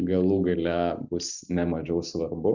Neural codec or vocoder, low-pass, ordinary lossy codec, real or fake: none; 7.2 kHz; Opus, 64 kbps; real